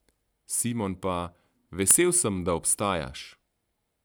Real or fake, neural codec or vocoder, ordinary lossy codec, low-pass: real; none; none; none